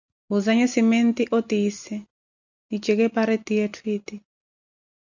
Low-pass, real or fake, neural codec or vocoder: 7.2 kHz; real; none